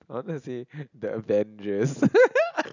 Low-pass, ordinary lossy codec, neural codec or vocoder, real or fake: 7.2 kHz; none; none; real